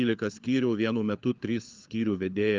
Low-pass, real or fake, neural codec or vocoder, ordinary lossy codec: 7.2 kHz; fake; codec, 16 kHz, 4 kbps, FunCodec, trained on LibriTTS, 50 frames a second; Opus, 24 kbps